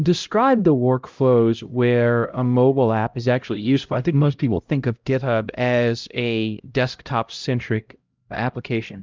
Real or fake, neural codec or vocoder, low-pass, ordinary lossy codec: fake; codec, 16 kHz, 0.5 kbps, X-Codec, HuBERT features, trained on LibriSpeech; 7.2 kHz; Opus, 24 kbps